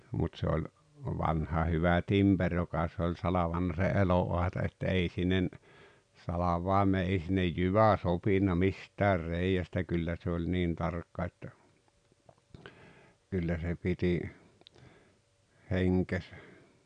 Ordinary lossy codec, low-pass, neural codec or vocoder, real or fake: none; 9.9 kHz; none; real